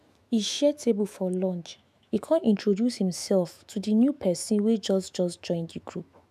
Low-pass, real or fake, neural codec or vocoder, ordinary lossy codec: 14.4 kHz; fake; autoencoder, 48 kHz, 128 numbers a frame, DAC-VAE, trained on Japanese speech; none